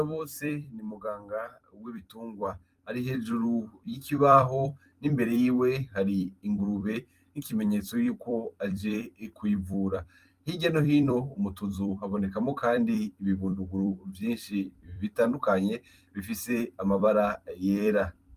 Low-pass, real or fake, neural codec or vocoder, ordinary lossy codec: 14.4 kHz; fake; vocoder, 44.1 kHz, 128 mel bands every 256 samples, BigVGAN v2; Opus, 32 kbps